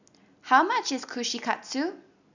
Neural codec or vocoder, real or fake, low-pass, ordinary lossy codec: none; real; 7.2 kHz; none